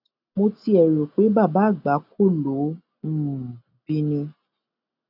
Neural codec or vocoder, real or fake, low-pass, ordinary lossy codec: none; real; 5.4 kHz; none